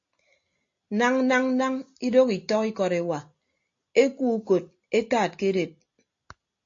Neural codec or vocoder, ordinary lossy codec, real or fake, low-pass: none; AAC, 48 kbps; real; 7.2 kHz